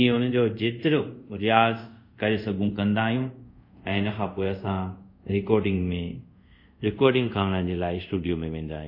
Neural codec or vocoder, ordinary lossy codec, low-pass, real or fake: codec, 24 kHz, 0.5 kbps, DualCodec; MP3, 32 kbps; 5.4 kHz; fake